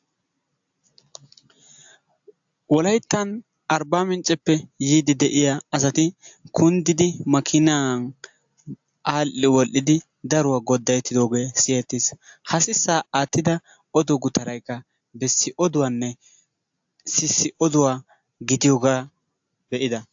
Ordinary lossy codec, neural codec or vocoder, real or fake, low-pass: AAC, 64 kbps; none; real; 7.2 kHz